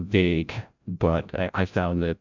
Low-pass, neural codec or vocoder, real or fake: 7.2 kHz; codec, 16 kHz, 0.5 kbps, FreqCodec, larger model; fake